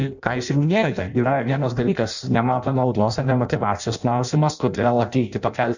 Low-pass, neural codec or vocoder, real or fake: 7.2 kHz; codec, 16 kHz in and 24 kHz out, 0.6 kbps, FireRedTTS-2 codec; fake